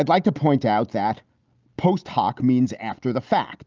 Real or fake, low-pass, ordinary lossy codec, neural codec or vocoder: real; 7.2 kHz; Opus, 24 kbps; none